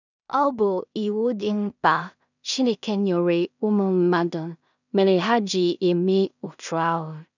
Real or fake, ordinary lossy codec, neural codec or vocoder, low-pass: fake; none; codec, 16 kHz in and 24 kHz out, 0.4 kbps, LongCat-Audio-Codec, two codebook decoder; 7.2 kHz